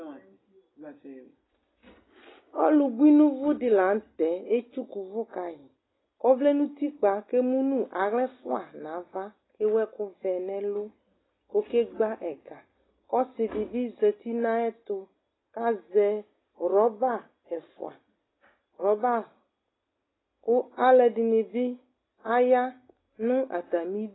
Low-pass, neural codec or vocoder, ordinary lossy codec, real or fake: 7.2 kHz; none; AAC, 16 kbps; real